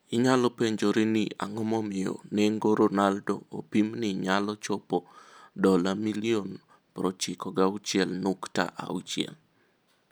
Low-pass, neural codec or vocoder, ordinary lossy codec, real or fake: none; none; none; real